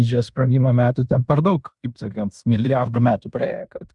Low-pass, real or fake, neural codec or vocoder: 10.8 kHz; fake; codec, 16 kHz in and 24 kHz out, 0.9 kbps, LongCat-Audio-Codec, fine tuned four codebook decoder